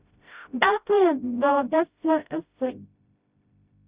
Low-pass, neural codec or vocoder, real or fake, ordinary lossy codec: 3.6 kHz; codec, 16 kHz, 0.5 kbps, FreqCodec, smaller model; fake; Opus, 64 kbps